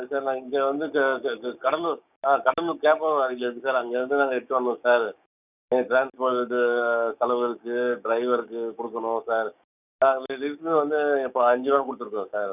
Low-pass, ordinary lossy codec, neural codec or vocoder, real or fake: 3.6 kHz; none; codec, 16 kHz, 6 kbps, DAC; fake